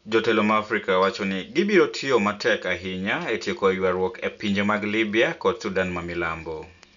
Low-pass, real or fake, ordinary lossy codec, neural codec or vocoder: 7.2 kHz; real; none; none